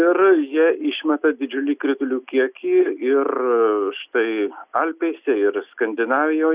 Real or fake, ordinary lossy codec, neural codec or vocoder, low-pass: real; Opus, 64 kbps; none; 3.6 kHz